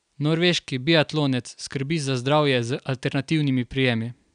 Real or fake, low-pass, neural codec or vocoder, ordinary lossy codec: real; 9.9 kHz; none; none